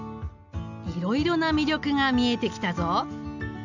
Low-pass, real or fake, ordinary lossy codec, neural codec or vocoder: 7.2 kHz; real; none; none